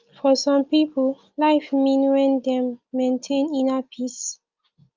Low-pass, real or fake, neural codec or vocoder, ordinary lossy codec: 7.2 kHz; real; none; Opus, 24 kbps